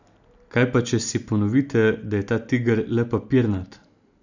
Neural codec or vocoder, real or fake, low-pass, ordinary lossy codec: none; real; 7.2 kHz; none